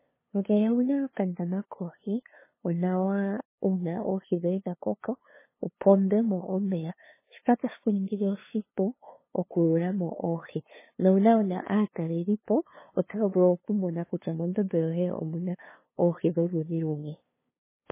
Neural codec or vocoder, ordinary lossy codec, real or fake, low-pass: codec, 16 kHz, 2 kbps, FunCodec, trained on LibriTTS, 25 frames a second; MP3, 16 kbps; fake; 3.6 kHz